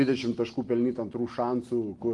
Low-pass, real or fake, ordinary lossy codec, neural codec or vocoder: 10.8 kHz; real; Opus, 24 kbps; none